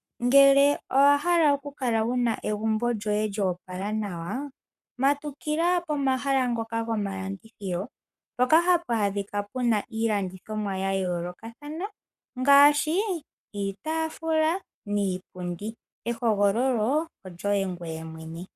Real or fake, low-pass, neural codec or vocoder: fake; 14.4 kHz; codec, 44.1 kHz, 7.8 kbps, Pupu-Codec